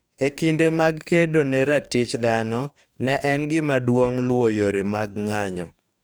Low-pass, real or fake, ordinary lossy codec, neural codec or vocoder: none; fake; none; codec, 44.1 kHz, 2.6 kbps, DAC